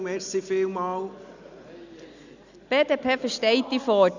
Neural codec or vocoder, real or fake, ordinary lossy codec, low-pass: none; real; none; 7.2 kHz